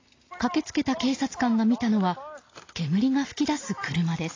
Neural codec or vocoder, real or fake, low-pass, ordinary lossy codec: none; real; 7.2 kHz; AAC, 32 kbps